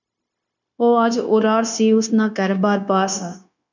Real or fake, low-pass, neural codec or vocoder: fake; 7.2 kHz; codec, 16 kHz, 0.9 kbps, LongCat-Audio-Codec